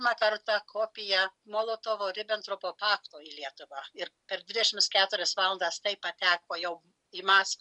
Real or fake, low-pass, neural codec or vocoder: real; 10.8 kHz; none